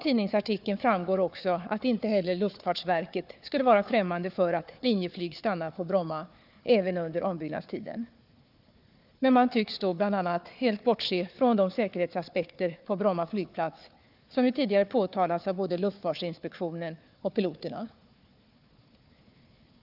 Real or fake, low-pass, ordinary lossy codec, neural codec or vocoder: fake; 5.4 kHz; none; codec, 16 kHz, 4 kbps, FunCodec, trained on Chinese and English, 50 frames a second